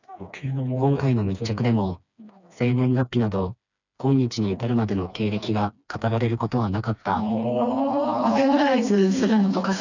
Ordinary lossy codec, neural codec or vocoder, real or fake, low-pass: none; codec, 16 kHz, 2 kbps, FreqCodec, smaller model; fake; 7.2 kHz